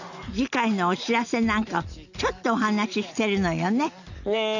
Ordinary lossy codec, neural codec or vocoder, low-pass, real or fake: none; none; 7.2 kHz; real